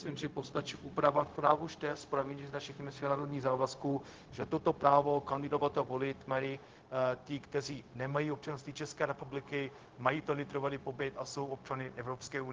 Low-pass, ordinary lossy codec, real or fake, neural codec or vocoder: 7.2 kHz; Opus, 16 kbps; fake; codec, 16 kHz, 0.4 kbps, LongCat-Audio-Codec